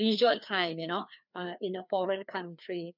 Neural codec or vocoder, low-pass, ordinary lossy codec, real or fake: codec, 16 kHz, 2 kbps, FreqCodec, larger model; 5.4 kHz; none; fake